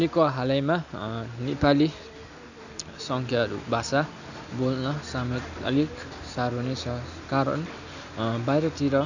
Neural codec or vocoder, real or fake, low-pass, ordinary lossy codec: none; real; 7.2 kHz; none